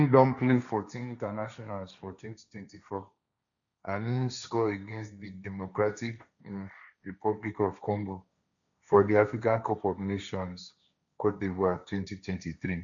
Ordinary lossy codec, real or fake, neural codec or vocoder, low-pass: none; fake; codec, 16 kHz, 1.1 kbps, Voila-Tokenizer; 7.2 kHz